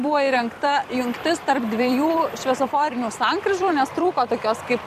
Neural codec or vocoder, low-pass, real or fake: vocoder, 44.1 kHz, 128 mel bands every 256 samples, BigVGAN v2; 14.4 kHz; fake